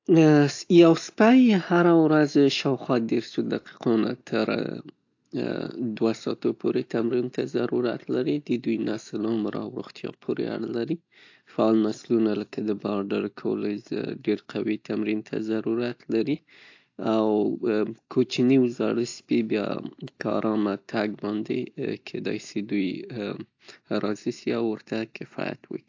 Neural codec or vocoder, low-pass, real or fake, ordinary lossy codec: none; 7.2 kHz; real; AAC, 48 kbps